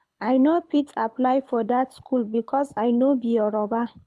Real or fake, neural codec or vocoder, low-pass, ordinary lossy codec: fake; codec, 24 kHz, 6 kbps, HILCodec; none; none